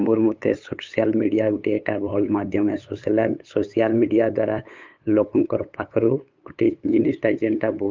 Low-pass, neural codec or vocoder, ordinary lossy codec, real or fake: 7.2 kHz; codec, 16 kHz, 8 kbps, FunCodec, trained on LibriTTS, 25 frames a second; Opus, 24 kbps; fake